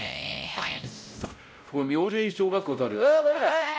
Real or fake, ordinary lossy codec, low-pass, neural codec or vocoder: fake; none; none; codec, 16 kHz, 0.5 kbps, X-Codec, WavLM features, trained on Multilingual LibriSpeech